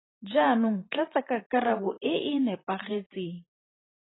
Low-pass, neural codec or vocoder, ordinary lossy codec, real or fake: 7.2 kHz; vocoder, 44.1 kHz, 128 mel bands every 512 samples, BigVGAN v2; AAC, 16 kbps; fake